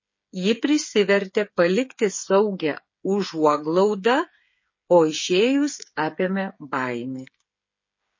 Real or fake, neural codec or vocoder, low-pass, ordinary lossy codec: fake; codec, 16 kHz, 8 kbps, FreqCodec, smaller model; 7.2 kHz; MP3, 32 kbps